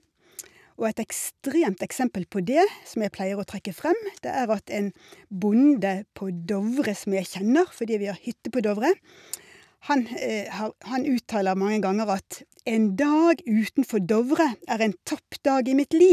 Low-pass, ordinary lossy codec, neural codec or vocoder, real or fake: 14.4 kHz; none; none; real